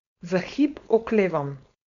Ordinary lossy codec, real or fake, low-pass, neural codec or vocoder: none; fake; 7.2 kHz; codec, 16 kHz, 4.8 kbps, FACodec